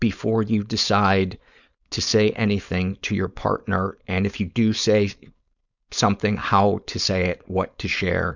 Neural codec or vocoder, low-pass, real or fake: codec, 16 kHz, 4.8 kbps, FACodec; 7.2 kHz; fake